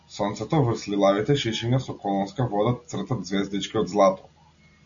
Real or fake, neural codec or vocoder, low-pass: real; none; 7.2 kHz